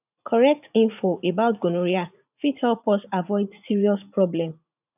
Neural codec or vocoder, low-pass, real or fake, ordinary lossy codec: vocoder, 44.1 kHz, 80 mel bands, Vocos; 3.6 kHz; fake; none